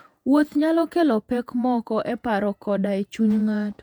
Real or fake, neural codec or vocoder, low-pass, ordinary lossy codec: fake; vocoder, 48 kHz, 128 mel bands, Vocos; 19.8 kHz; MP3, 96 kbps